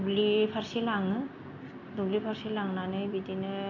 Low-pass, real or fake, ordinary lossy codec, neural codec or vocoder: 7.2 kHz; real; none; none